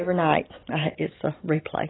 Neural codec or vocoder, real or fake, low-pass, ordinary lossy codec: none; real; 7.2 kHz; AAC, 16 kbps